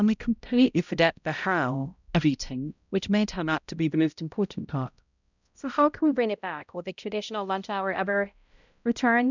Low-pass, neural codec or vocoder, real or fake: 7.2 kHz; codec, 16 kHz, 0.5 kbps, X-Codec, HuBERT features, trained on balanced general audio; fake